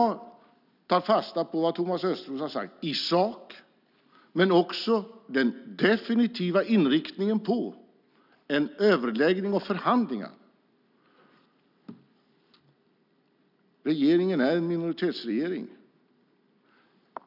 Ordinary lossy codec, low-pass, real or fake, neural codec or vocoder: none; 5.4 kHz; real; none